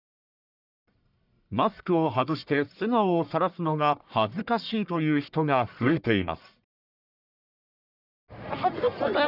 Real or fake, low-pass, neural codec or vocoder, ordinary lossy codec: fake; 5.4 kHz; codec, 44.1 kHz, 1.7 kbps, Pupu-Codec; none